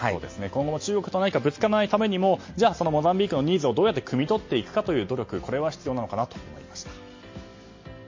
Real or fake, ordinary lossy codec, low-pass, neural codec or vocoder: real; MP3, 32 kbps; 7.2 kHz; none